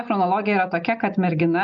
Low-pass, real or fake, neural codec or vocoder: 7.2 kHz; real; none